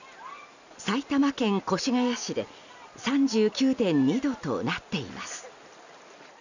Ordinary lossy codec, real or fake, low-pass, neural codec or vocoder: none; real; 7.2 kHz; none